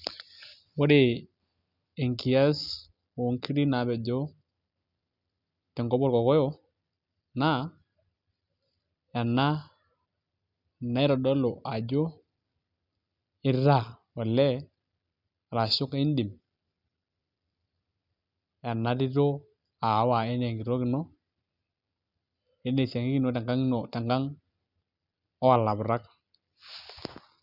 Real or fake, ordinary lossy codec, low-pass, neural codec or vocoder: real; none; 5.4 kHz; none